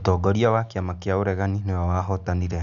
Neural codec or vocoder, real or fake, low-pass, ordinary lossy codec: none; real; 7.2 kHz; none